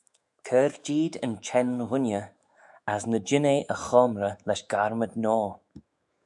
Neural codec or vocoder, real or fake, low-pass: autoencoder, 48 kHz, 128 numbers a frame, DAC-VAE, trained on Japanese speech; fake; 10.8 kHz